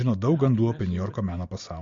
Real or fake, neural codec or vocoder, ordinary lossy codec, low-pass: real; none; MP3, 48 kbps; 7.2 kHz